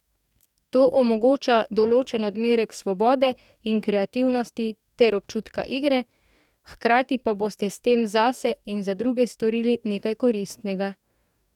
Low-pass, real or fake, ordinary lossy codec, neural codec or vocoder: 19.8 kHz; fake; none; codec, 44.1 kHz, 2.6 kbps, DAC